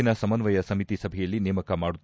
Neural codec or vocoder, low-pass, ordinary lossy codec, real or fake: none; none; none; real